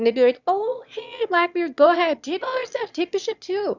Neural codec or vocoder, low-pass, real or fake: autoencoder, 22.05 kHz, a latent of 192 numbers a frame, VITS, trained on one speaker; 7.2 kHz; fake